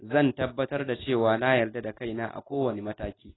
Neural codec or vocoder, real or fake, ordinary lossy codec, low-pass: none; real; AAC, 16 kbps; 7.2 kHz